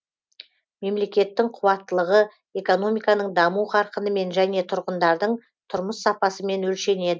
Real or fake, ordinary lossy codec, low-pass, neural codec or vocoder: real; none; none; none